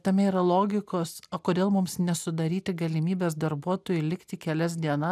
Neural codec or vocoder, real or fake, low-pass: none; real; 14.4 kHz